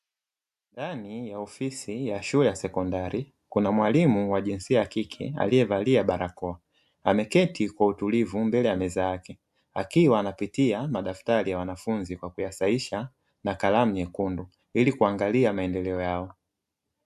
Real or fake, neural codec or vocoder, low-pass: real; none; 14.4 kHz